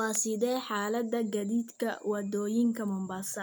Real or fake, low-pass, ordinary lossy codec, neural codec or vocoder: real; none; none; none